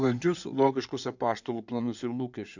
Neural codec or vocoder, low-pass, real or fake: codec, 16 kHz in and 24 kHz out, 2.2 kbps, FireRedTTS-2 codec; 7.2 kHz; fake